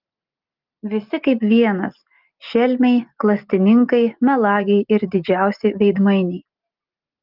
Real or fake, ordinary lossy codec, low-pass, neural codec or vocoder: real; Opus, 32 kbps; 5.4 kHz; none